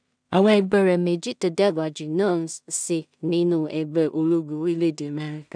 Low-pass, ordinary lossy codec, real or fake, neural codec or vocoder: 9.9 kHz; none; fake; codec, 16 kHz in and 24 kHz out, 0.4 kbps, LongCat-Audio-Codec, two codebook decoder